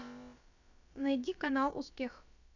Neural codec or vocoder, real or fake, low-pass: codec, 16 kHz, about 1 kbps, DyCAST, with the encoder's durations; fake; 7.2 kHz